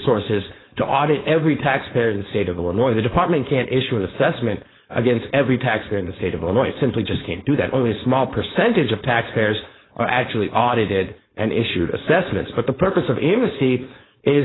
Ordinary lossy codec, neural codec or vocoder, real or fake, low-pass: AAC, 16 kbps; codec, 16 kHz, 4.8 kbps, FACodec; fake; 7.2 kHz